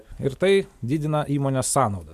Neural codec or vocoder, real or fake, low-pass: none; real; 14.4 kHz